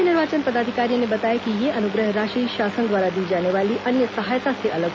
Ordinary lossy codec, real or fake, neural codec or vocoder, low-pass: none; real; none; none